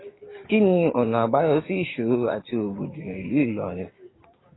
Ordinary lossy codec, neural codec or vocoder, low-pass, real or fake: AAC, 16 kbps; codec, 16 kHz, 6 kbps, DAC; 7.2 kHz; fake